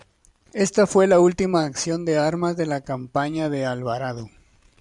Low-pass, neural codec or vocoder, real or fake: 10.8 kHz; vocoder, 44.1 kHz, 128 mel bands every 512 samples, BigVGAN v2; fake